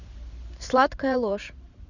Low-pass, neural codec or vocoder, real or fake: 7.2 kHz; vocoder, 44.1 kHz, 128 mel bands every 512 samples, BigVGAN v2; fake